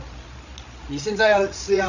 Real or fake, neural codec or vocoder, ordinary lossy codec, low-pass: fake; codec, 16 kHz, 16 kbps, FreqCodec, larger model; none; 7.2 kHz